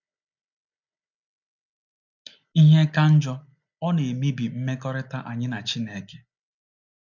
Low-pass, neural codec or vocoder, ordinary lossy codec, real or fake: 7.2 kHz; none; none; real